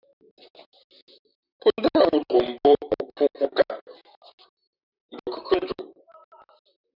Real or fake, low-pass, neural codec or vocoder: fake; 5.4 kHz; vocoder, 44.1 kHz, 128 mel bands, Pupu-Vocoder